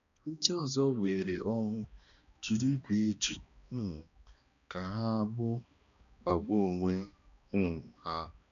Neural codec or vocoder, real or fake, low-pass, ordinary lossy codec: codec, 16 kHz, 1 kbps, X-Codec, HuBERT features, trained on balanced general audio; fake; 7.2 kHz; none